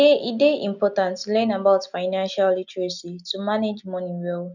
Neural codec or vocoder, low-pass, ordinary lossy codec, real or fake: none; 7.2 kHz; none; real